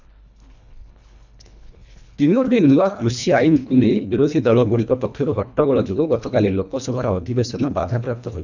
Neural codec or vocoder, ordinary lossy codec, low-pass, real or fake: codec, 24 kHz, 1.5 kbps, HILCodec; none; 7.2 kHz; fake